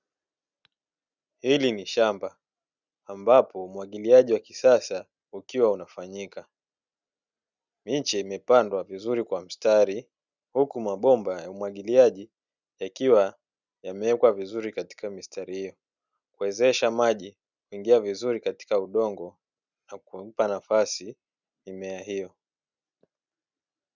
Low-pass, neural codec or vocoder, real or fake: 7.2 kHz; none; real